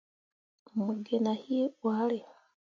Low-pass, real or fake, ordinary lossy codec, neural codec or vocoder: 7.2 kHz; real; MP3, 48 kbps; none